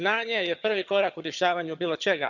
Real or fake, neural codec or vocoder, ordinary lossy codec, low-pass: fake; vocoder, 22.05 kHz, 80 mel bands, HiFi-GAN; none; 7.2 kHz